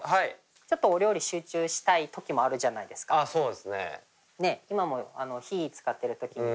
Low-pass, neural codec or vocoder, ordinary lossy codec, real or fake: none; none; none; real